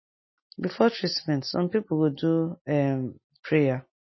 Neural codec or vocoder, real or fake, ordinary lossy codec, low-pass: none; real; MP3, 24 kbps; 7.2 kHz